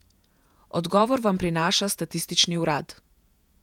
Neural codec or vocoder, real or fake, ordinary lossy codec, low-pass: vocoder, 48 kHz, 128 mel bands, Vocos; fake; none; 19.8 kHz